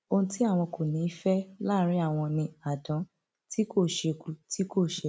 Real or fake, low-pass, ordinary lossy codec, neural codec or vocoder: real; none; none; none